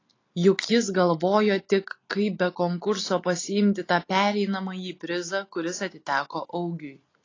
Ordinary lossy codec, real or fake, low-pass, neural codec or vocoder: AAC, 32 kbps; real; 7.2 kHz; none